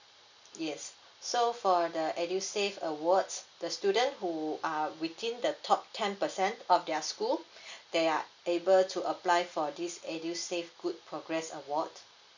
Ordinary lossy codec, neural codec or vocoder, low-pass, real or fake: none; none; 7.2 kHz; real